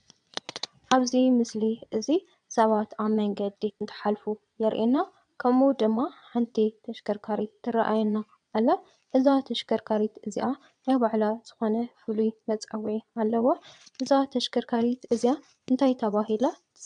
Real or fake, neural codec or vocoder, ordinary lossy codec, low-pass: fake; vocoder, 22.05 kHz, 80 mel bands, Vocos; MP3, 96 kbps; 9.9 kHz